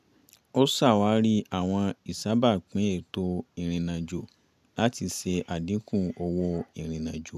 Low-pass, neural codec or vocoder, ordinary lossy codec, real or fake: 14.4 kHz; none; none; real